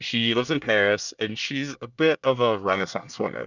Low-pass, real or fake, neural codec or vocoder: 7.2 kHz; fake; codec, 24 kHz, 1 kbps, SNAC